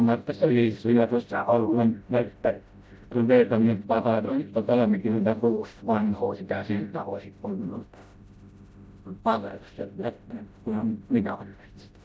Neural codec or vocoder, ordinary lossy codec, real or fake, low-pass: codec, 16 kHz, 0.5 kbps, FreqCodec, smaller model; none; fake; none